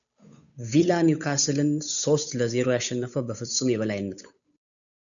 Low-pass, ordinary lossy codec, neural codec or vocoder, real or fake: 7.2 kHz; MP3, 96 kbps; codec, 16 kHz, 8 kbps, FunCodec, trained on Chinese and English, 25 frames a second; fake